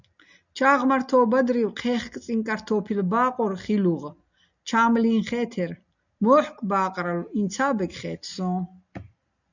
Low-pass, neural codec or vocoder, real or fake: 7.2 kHz; none; real